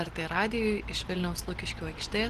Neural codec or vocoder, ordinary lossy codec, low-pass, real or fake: none; Opus, 16 kbps; 14.4 kHz; real